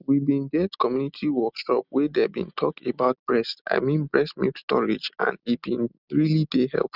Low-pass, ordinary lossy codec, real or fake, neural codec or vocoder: 5.4 kHz; none; real; none